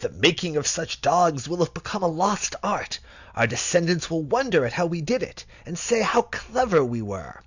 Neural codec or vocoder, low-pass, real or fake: none; 7.2 kHz; real